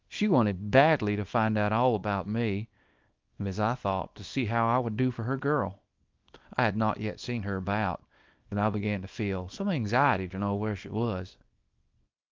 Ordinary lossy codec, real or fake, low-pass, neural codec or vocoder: Opus, 24 kbps; fake; 7.2 kHz; codec, 24 kHz, 0.9 kbps, WavTokenizer, small release